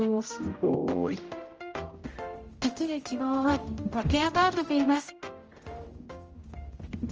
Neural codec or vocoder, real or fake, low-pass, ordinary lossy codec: codec, 16 kHz, 0.5 kbps, X-Codec, HuBERT features, trained on general audio; fake; 7.2 kHz; Opus, 24 kbps